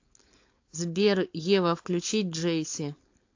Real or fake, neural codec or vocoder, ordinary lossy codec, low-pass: fake; codec, 16 kHz, 4.8 kbps, FACodec; AAC, 48 kbps; 7.2 kHz